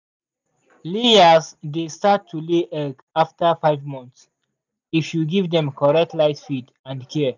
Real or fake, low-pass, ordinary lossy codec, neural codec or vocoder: real; 7.2 kHz; none; none